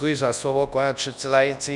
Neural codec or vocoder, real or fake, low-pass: codec, 24 kHz, 0.9 kbps, WavTokenizer, large speech release; fake; 10.8 kHz